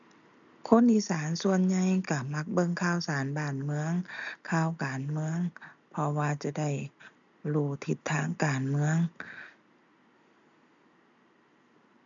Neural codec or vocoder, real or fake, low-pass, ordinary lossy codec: none; real; 7.2 kHz; none